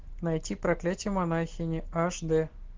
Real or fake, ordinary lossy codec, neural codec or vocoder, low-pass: real; Opus, 16 kbps; none; 7.2 kHz